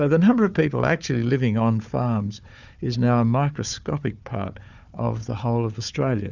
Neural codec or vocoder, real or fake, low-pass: codec, 16 kHz, 4 kbps, FunCodec, trained on Chinese and English, 50 frames a second; fake; 7.2 kHz